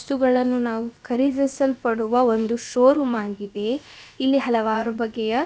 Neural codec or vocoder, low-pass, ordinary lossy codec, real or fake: codec, 16 kHz, about 1 kbps, DyCAST, with the encoder's durations; none; none; fake